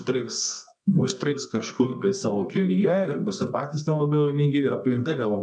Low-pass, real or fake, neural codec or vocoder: 9.9 kHz; fake; codec, 24 kHz, 0.9 kbps, WavTokenizer, medium music audio release